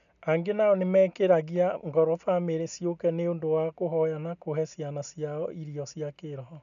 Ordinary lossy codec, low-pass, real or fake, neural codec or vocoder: none; 7.2 kHz; real; none